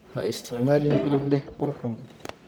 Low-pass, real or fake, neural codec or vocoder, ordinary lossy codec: none; fake; codec, 44.1 kHz, 1.7 kbps, Pupu-Codec; none